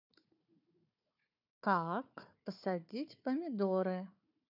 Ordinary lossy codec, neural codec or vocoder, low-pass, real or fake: none; codec, 16 kHz, 4 kbps, FunCodec, trained on Chinese and English, 50 frames a second; 5.4 kHz; fake